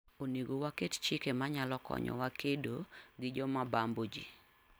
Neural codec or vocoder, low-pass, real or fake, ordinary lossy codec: none; none; real; none